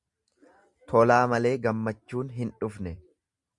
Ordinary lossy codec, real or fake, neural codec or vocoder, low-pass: Opus, 64 kbps; real; none; 10.8 kHz